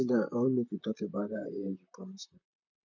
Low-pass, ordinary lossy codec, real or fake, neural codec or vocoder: 7.2 kHz; none; fake; vocoder, 44.1 kHz, 128 mel bands, Pupu-Vocoder